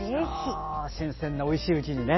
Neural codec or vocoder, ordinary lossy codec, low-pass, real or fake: none; MP3, 24 kbps; 7.2 kHz; real